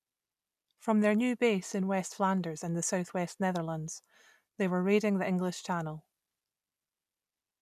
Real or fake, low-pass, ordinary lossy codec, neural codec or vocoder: real; 14.4 kHz; none; none